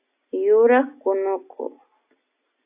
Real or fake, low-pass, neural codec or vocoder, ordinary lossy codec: real; 3.6 kHz; none; AAC, 24 kbps